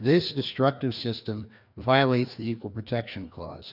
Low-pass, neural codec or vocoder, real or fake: 5.4 kHz; codec, 16 kHz, 2 kbps, FreqCodec, larger model; fake